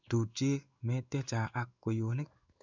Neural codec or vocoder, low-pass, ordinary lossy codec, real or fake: codec, 16 kHz in and 24 kHz out, 1 kbps, XY-Tokenizer; 7.2 kHz; none; fake